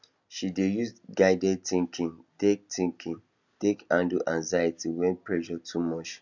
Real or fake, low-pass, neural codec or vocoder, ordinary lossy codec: real; 7.2 kHz; none; none